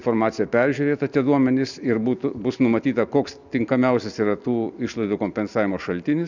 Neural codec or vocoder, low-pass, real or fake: none; 7.2 kHz; real